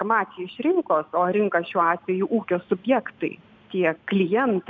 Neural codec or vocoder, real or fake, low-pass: none; real; 7.2 kHz